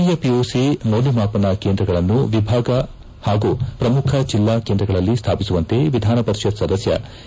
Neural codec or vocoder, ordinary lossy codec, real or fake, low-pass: none; none; real; none